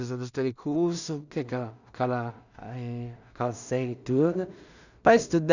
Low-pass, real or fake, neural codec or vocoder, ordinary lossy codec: 7.2 kHz; fake; codec, 16 kHz in and 24 kHz out, 0.4 kbps, LongCat-Audio-Codec, two codebook decoder; none